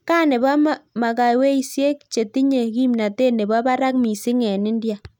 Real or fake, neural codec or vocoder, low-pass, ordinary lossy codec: real; none; 19.8 kHz; none